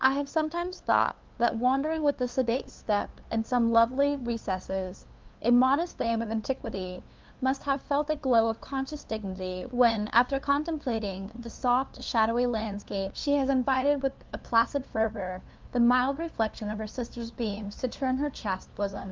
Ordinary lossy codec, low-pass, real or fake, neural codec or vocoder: Opus, 24 kbps; 7.2 kHz; fake; codec, 16 kHz, 2 kbps, FunCodec, trained on LibriTTS, 25 frames a second